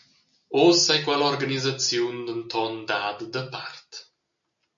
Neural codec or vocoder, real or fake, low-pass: none; real; 7.2 kHz